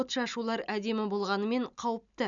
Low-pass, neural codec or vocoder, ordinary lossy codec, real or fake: 7.2 kHz; none; none; real